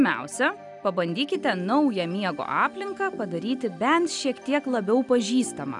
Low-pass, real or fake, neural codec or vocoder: 10.8 kHz; real; none